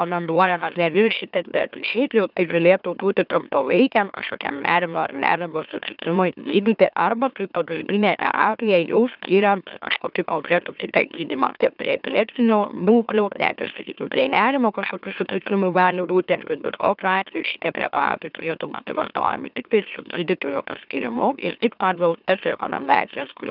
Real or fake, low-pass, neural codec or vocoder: fake; 5.4 kHz; autoencoder, 44.1 kHz, a latent of 192 numbers a frame, MeloTTS